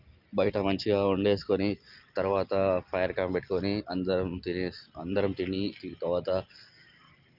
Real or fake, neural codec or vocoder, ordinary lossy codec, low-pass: real; none; Opus, 24 kbps; 5.4 kHz